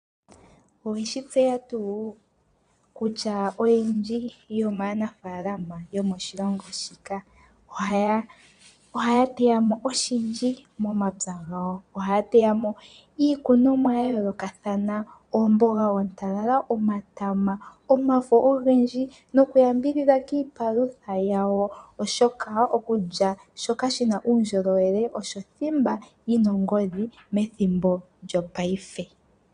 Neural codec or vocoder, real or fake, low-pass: vocoder, 22.05 kHz, 80 mel bands, Vocos; fake; 9.9 kHz